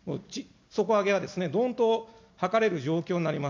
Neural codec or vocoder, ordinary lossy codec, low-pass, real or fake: none; MP3, 48 kbps; 7.2 kHz; real